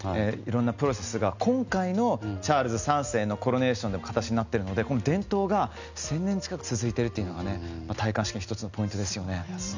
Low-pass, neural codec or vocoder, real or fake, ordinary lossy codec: 7.2 kHz; none; real; none